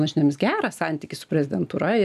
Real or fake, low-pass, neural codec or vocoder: real; 14.4 kHz; none